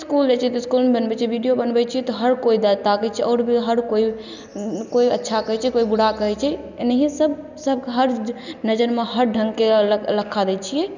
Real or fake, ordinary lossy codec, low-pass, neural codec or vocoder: real; none; 7.2 kHz; none